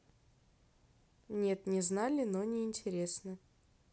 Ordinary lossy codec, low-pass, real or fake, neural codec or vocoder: none; none; real; none